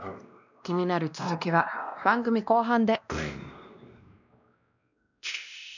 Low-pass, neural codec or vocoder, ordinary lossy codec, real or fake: 7.2 kHz; codec, 16 kHz, 1 kbps, X-Codec, WavLM features, trained on Multilingual LibriSpeech; none; fake